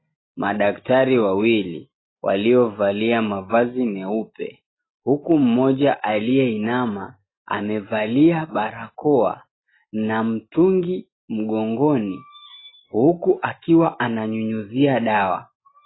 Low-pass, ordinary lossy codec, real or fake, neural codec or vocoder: 7.2 kHz; AAC, 16 kbps; real; none